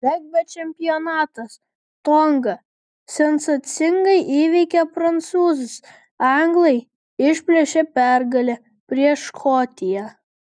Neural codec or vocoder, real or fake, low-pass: none; real; 9.9 kHz